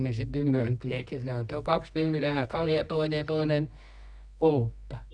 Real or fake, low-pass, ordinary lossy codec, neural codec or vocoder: fake; 9.9 kHz; none; codec, 24 kHz, 0.9 kbps, WavTokenizer, medium music audio release